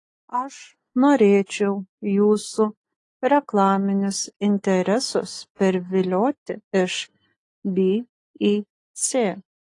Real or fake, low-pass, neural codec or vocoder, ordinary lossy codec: real; 10.8 kHz; none; AAC, 48 kbps